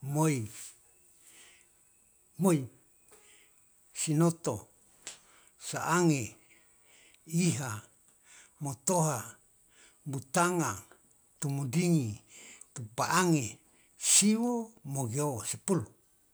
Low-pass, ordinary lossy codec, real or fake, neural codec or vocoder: none; none; real; none